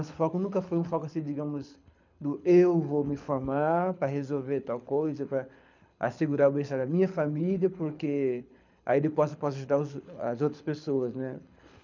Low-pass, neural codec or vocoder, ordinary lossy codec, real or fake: 7.2 kHz; codec, 24 kHz, 6 kbps, HILCodec; none; fake